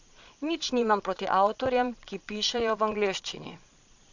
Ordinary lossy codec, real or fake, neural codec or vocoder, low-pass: none; fake; vocoder, 22.05 kHz, 80 mel bands, Vocos; 7.2 kHz